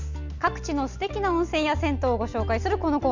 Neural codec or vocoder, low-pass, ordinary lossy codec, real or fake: none; 7.2 kHz; none; real